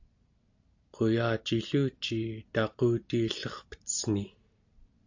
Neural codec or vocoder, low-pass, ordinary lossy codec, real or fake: none; 7.2 kHz; AAC, 48 kbps; real